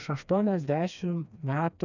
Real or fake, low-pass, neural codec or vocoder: fake; 7.2 kHz; codec, 16 kHz, 2 kbps, FreqCodec, smaller model